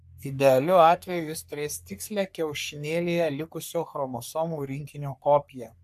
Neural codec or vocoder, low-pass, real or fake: codec, 44.1 kHz, 3.4 kbps, Pupu-Codec; 14.4 kHz; fake